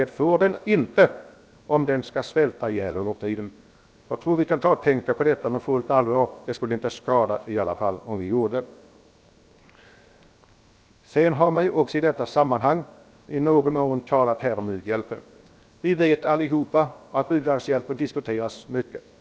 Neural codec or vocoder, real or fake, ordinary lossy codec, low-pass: codec, 16 kHz, 0.7 kbps, FocalCodec; fake; none; none